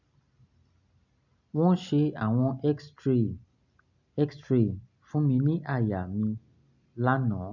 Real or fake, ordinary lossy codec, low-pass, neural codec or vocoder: real; none; 7.2 kHz; none